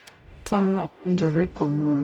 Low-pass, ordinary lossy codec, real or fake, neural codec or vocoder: 19.8 kHz; none; fake; codec, 44.1 kHz, 0.9 kbps, DAC